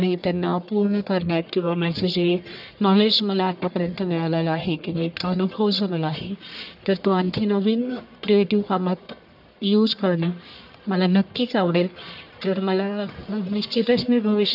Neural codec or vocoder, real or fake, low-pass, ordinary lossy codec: codec, 44.1 kHz, 1.7 kbps, Pupu-Codec; fake; 5.4 kHz; AAC, 48 kbps